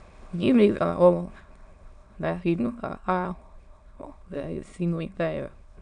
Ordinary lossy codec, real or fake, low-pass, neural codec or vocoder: none; fake; 9.9 kHz; autoencoder, 22.05 kHz, a latent of 192 numbers a frame, VITS, trained on many speakers